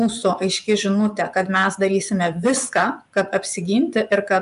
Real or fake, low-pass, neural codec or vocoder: fake; 10.8 kHz; vocoder, 24 kHz, 100 mel bands, Vocos